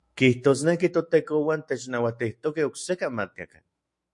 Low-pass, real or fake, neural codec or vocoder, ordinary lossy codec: 10.8 kHz; fake; autoencoder, 48 kHz, 128 numbers a frame, DAC-VAE, trained on Japanese speech; MP3, 48 kbps